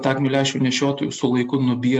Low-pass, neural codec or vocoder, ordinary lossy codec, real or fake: 9.9 kHz; none; MP3, 64 kbps; real